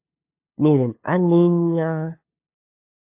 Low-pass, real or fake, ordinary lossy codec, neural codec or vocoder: 3.6 kHz; fake; AAC, 24 kbps; codec, 16 kHz, 2 kbps, FunCodec, trained on LibriTTS, 25 frames a second